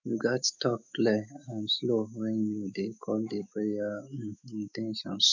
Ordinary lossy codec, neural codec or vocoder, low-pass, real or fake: none; autoencoder, 48 kHz, 128 numbers a frame, DAC-VAE, trained on Japanese speech; 7.2 kHz; fake